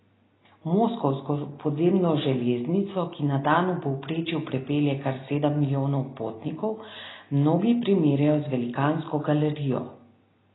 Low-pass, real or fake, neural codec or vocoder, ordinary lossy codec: 7.2 kHz; real; none; AAC, 16 kbps